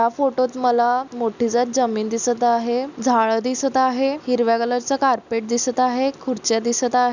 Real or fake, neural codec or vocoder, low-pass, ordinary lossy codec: real; none; 7.2 kHz; none